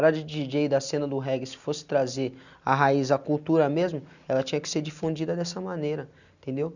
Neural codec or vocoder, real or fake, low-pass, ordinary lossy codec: none; real; 7.2 kHz; none